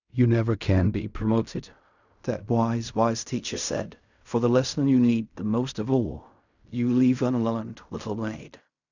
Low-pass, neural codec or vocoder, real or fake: 7.2 kHz; codec, 16 kHz in and 24 kHz out, 0.4 kbps, LongCat-Audio-Codec, fine tuned four codebook decoder; fake